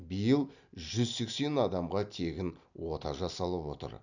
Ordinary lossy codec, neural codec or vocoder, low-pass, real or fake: none; none; 7.2 kHz; real